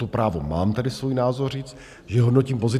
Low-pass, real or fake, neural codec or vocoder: 14.4 kHz; real; none